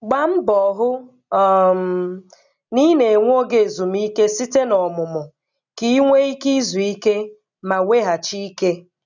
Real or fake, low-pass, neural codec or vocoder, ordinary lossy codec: real; 7.2 kHz; none; none